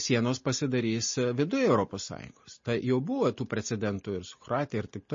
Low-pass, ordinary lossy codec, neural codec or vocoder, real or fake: 7.2 kHz; MP3, 32 kbps; none; real